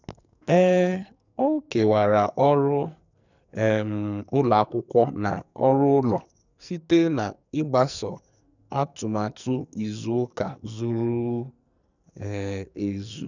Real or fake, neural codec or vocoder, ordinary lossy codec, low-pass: fake; codec, 44.1 kHz, 2.6 kbps, SNAC; none; 7.2 kHz